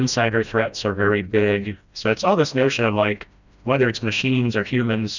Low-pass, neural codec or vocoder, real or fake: 7.2 kHz; codec, 16 kHz, 1 kbps, FreqCodec, smaller model; fake